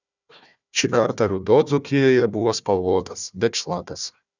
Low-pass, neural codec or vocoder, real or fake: 7.2 kHz; codec, 16 kHz, 1 kbps, FunCodec, trained on Chinese and English, 50 frames a second; fake